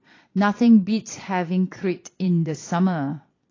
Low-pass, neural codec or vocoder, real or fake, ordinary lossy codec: 7.2 kHz; vocoder, 22.05 kHz, 80 mel bands, Vocos; fake; AAC, 32 kbps